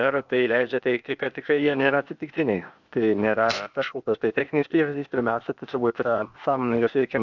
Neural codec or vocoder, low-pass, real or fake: codec, 16 kHz, 0.8 kbps, ZipCodec; 7.2 kHz; fake